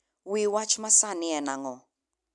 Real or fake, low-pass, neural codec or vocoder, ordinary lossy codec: real; 10.8 kHz; none; none